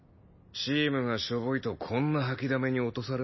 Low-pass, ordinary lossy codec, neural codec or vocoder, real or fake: 7.2 kHz; MP3, 24 kbps; none; real